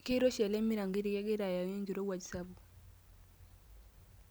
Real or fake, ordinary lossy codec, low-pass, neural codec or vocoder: real; none; none; none